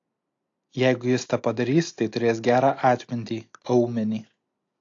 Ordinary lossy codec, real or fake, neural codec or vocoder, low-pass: AAC, 48 kbps; real; none; 7.2 kHz